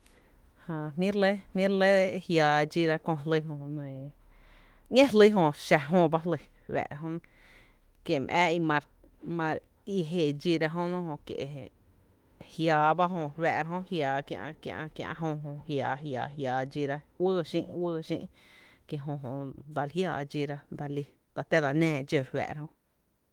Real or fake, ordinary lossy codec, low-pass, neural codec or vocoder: fake; Opus, 24 kbps; 19.8 kHz; autoencoder, 48 kHz, 32 numbers a frame, DAC-VAE, trained on Japanese speech